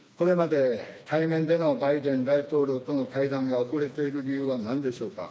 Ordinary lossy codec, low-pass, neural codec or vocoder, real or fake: none; none; codec, 16 kHz, 2 kbps, FreqCodec, smaller model; fake